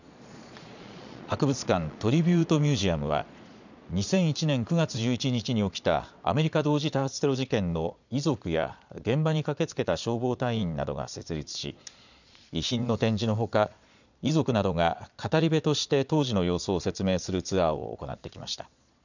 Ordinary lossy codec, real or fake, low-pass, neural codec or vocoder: none; fake; 7.2 kHz; vocoder, 22.05 kHz, 80 mel bands, Vocos